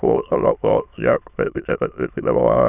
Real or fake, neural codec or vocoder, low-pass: fake; autoencoder, 22.05 kHz, a latent of 192 numbers a frame, VITS, trained on many speakers; 3.6 kHz